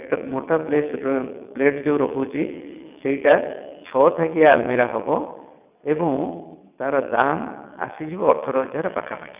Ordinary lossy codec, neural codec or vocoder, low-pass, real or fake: none; vocoder, 22.05 kHz, 80 mel bands, WaveNeXt; 3.6 kHz; fake